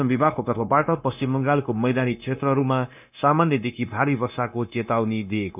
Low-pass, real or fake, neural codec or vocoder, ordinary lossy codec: 3.6 kHz; fake; codec, 16 kHz, 0.9 kbps, LongCat-Audio-Codec; none